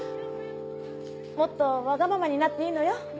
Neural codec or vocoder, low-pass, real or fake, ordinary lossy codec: none; none; real; none